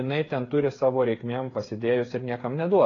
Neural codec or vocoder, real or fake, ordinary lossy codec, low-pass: codec, 16 kHz, 8 kbps, FreqCodec, smaller model; fake; AAC, 32 kbps; 7.2 kHz